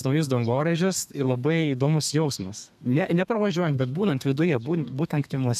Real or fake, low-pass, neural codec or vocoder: fake; 14.4 kHz; codec, 32 kHz, 1.9 kbps, SNAC